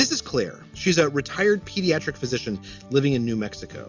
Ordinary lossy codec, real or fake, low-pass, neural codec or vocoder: MP3, 64 kbps; real; 7.2 kHz; none